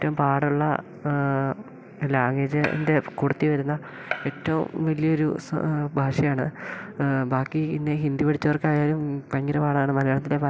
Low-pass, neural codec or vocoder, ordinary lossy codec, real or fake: none; none; none; real